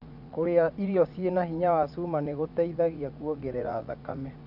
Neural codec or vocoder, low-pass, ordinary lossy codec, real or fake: vocoder, 44.1 kHz, 80 mel bands, Vocos; 5.4 kHz; none; fake